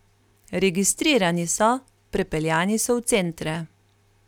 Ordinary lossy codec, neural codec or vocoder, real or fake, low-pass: none; none; real; 19.8 kHz